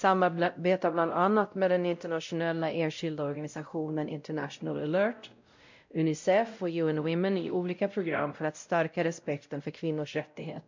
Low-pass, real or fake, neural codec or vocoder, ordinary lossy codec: 7.2 kHz; fake; codec, 16 kHz, 0.5 kbps, X-Codec, WavLM features, trained on Multilingual LibriSpeech; MP3, 64 kbps